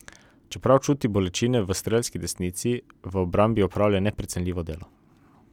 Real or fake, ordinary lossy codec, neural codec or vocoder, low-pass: real; none; none; 19.8 kHz